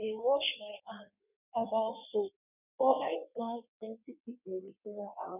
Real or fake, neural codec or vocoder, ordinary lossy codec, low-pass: fake; codec, 16 kHz in and 24 kHz out, 1.1 kbps, FireRedTTS-2 codec; none; 3.6 kHz